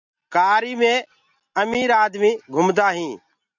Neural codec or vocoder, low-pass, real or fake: none; 7.2 kHz; real